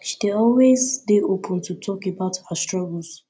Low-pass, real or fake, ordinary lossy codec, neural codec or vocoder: none; real; none; none